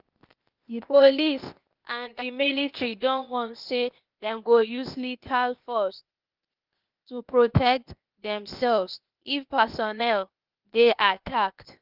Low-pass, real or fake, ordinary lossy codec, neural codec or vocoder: 5.4 kHz; fake; Opus, 24 kbps; codec, 16 kHz, 0.8 kbps, ZipCodec